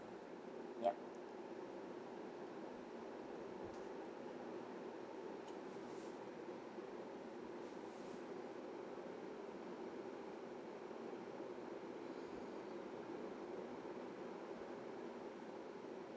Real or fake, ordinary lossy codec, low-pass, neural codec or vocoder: real; none; none; none